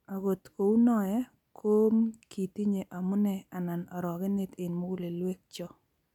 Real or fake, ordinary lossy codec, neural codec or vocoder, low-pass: real; none; none; 19.8 kHz